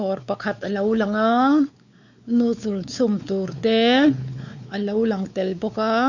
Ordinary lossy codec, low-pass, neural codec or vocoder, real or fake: none; 7.2 kHz; codec, 16 kHz, 4 kbps, X-Codec, WavLM features, trained on Multilingual LibriSpeech; fake